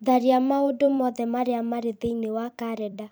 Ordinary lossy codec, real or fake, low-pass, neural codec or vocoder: none; fake; none; vocoder, 44.1 kHz, 128 mel bands every 256 samples, BigVGAN v2